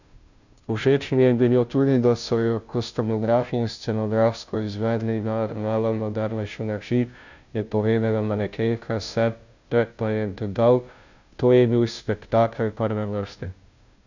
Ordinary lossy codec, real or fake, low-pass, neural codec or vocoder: none; fake; 7.2 kHz; codec, 16 kHz, 0.5 kbps, FunCodec, trained on Chinese and English, 25 frames a second